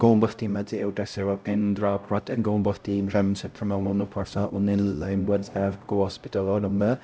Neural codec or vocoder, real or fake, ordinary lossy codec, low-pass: codec, 16 kHz, 0.5 kbps, X-Codec, HuBERT features, trained on LibriSpeech; fake; none; none